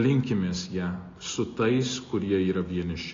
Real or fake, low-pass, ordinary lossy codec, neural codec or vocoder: real; 7.2 kHz; AAC, 32 kbps; none